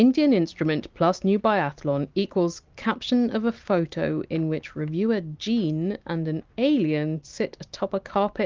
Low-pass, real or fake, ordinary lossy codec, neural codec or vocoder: 7.2 kHz; real; Opus, 32 kbps; none